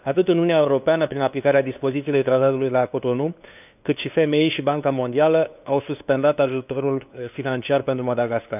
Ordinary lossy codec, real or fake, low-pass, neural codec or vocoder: none; fake; 3.6 kHz; codec, 16 kHz, 2 kbps, FunCodec, trained on LibriTTS, 25 frames a second